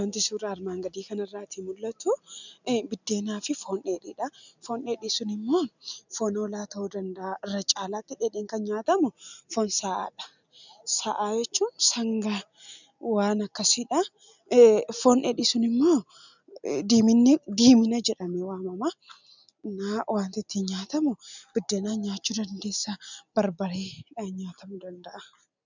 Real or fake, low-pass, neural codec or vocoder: real; 7.2 kHz; none